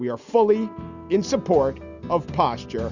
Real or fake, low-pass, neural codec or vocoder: real; 7.2 kHz; none